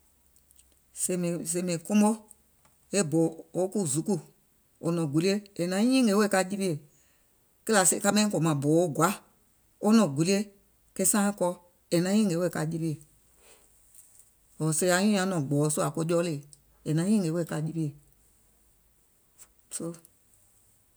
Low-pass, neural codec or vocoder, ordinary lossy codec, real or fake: none; none; none; real